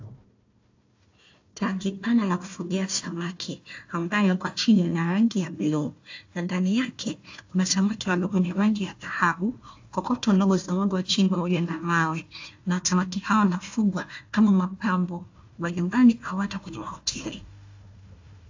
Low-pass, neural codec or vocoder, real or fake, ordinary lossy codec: 7.2 kHz; codec, 16 kHz, 1 kbps, FunCodec, trained on Chinese and English, 50 frames a second; fake; AAC, 48 kbps